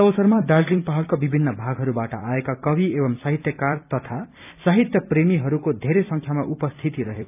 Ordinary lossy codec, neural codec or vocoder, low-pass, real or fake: none; vocoder, 44.1 kHz, 128 mel bands every 256 samples, BigVGAN v2; 3.6 kHz; fake